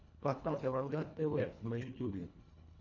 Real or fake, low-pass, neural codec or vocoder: fake; 7.2 kHz; codec, 24 kHz, 1.5 kbps, HILCodec